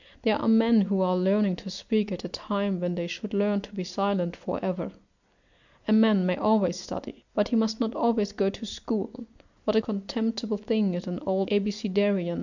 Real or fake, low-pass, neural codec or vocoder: real; 7.2 kHz; none